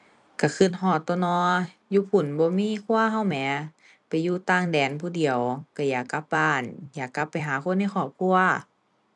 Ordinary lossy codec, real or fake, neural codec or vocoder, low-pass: none; fake; vocoder, 44.1 kHz, 128 mel bands every 256 samples, BigVGAN v2; 10.8 kHz